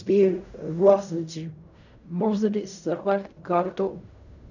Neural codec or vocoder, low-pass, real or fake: codec, 16 kHz in and 24 kHz out, 0.4 kbps, LongCat-Audio-Codec, fine tuned four codebook decoder; 7.2 kHz; fake